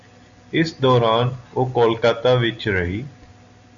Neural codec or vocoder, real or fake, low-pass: none; real; 7.2 kHz